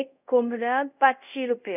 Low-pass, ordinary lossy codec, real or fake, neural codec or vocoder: 3.6 kHz; none; fake; codec, 24 kHz, 0.5 kbps, DualCodec